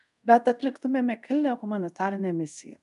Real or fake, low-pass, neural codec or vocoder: fake; 10.8 kHz; codec, 24 kHz, 0.5 kbps, DualCodec